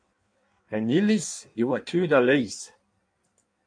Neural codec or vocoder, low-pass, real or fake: codec, 16 kHz in and 24 kHz out, 1.1 kbps, FireRedTTS-2 codec; 9.9 kHz; fake